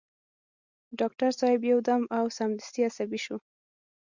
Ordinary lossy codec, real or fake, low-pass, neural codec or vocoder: Opus, 64 kbps; real; 7.2 kHz; none